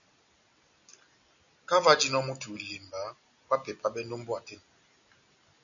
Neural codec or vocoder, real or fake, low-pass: none; real; 7.2 kHz